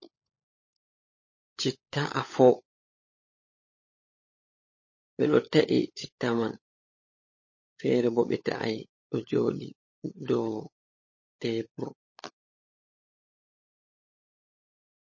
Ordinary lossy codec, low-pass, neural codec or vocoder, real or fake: MP3, 32 kbps; 7.2 kHz; codec, 16 kHz, 16 kbps, FunCodec, trained on LibriTTS, 50 frames a second; fake